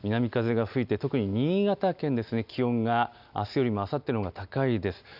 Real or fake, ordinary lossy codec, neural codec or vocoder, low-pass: real; none; none; 5.4 kHz